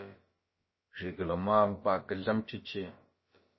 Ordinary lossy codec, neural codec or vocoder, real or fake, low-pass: MP3, 24 kbps; codec, 16 kHz, about 1 kbps, DyCAST, with the encoder's durations; fake; 7.2 kHz